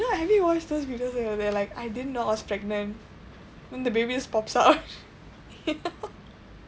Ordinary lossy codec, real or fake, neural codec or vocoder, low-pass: none; real; none; none